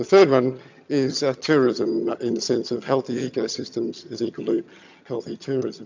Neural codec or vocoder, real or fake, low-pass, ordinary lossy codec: vocoder, 22.05 kHz, 80 mel bands, HiFi-GAN; fake; 7.2 kHz; MP3, 64 kbps